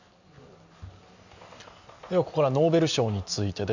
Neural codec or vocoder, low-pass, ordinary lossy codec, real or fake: none; 7.2 kHz; none; real